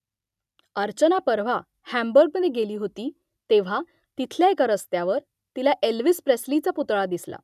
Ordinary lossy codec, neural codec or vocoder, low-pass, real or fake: none; none; 14.4 kHz; real